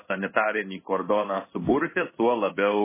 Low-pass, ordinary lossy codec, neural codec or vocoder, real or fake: 3.6 kHz; MP3, 16 kbps; codec, 16 kHz in and 24 kHz out, 1 kbps, XY-Tokenizer; fake